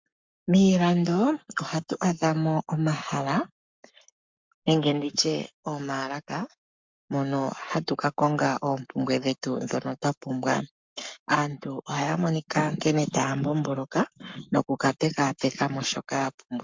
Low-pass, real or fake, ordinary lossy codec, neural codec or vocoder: 7.2 kHz; fake; MP3, 64 kbps; codec, 44.1 kHz, 7.8 kbps, Pupu-Codec